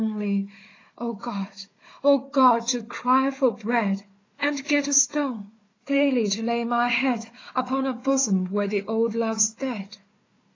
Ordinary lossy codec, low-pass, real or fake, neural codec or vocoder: AAC, 32 kbps; 7.2 kHz; fake; codec, 16 kHz, 4 kbps, FunCodec, trained on Chinese and English, 50 frames a second